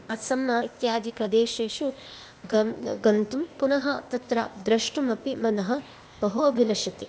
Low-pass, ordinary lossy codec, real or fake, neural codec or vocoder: none; none; fake; codec, 16 kHz, 0.8 kbps, ZipCodec